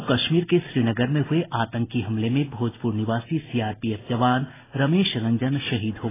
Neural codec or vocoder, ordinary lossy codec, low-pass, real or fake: none; AAC, 16 kbps; 3.6 kHz; real